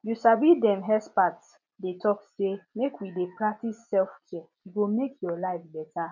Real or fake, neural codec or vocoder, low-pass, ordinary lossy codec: real; none; 7.2 kHz; none